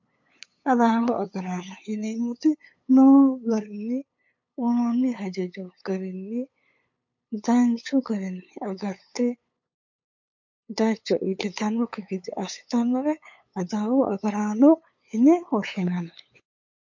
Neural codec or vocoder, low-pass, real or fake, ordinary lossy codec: codec, 16 kHz, 8 kbps, FunCodec, trained on LibriTTS, 25 frames a second; 7.2 kHz; fake; MP3, 48 kbps